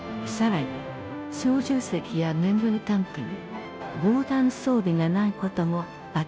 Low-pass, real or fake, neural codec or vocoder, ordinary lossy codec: none; fake; codec, 16 kHz, 0.5 kbps, FunCodec, trained on Chinese and English, 25 frames a second; none